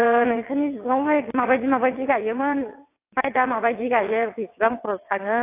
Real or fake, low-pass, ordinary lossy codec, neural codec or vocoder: fake; 3.6 kHz; AAC, 24 kbps; vocoder, 22.05 kHz, 80 mel bands, WaveNeXt